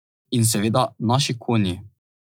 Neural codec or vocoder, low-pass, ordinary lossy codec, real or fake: none; none; none; real